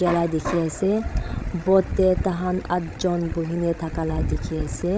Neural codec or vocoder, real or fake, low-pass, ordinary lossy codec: codec, 16 kHz, 16 kbps, FreqCodec, larger model; fake; none; none